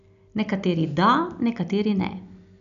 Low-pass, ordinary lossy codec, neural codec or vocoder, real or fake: 7.2 kHz; none; none; real